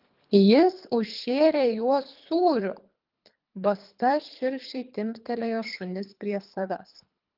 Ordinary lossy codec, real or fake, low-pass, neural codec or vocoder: Opus, 24 kbps; fake; 5.4 kHz; codec, 24 kHz, 3 kbps, HILCodec